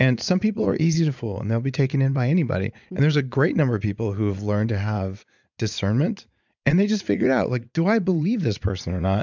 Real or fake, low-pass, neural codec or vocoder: fake; 7.2 kHz; vocoder, 44.1 kHz, 80 mel bands, Vocos